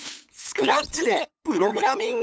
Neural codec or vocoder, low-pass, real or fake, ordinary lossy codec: codec, 16 kHz, 8 kbps, FunCodec, trained on LibriTTS, 25 frames a second; none; fake; none